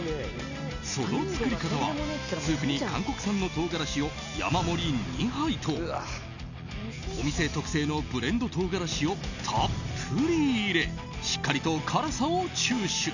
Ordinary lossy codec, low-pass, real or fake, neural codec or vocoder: none; 7.2 kHz; real; none